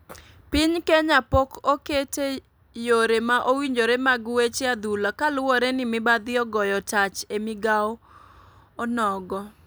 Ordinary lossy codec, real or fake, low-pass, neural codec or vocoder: none; real; none; none